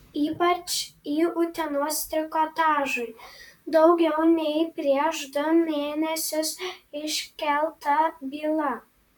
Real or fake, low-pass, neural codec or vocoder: fake; 19.8 kHz; vocoder, 44.1 kHz, 128 mel bands, Pupu-Vocoder